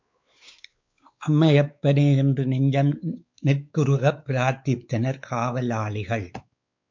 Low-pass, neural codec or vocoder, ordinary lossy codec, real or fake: 7.2 kHz; codec, 16 kHz, 4 kbps, X-Codec, WavLM features, trained on Multilingual LibriSpeech; MP3, 64 kbps; fake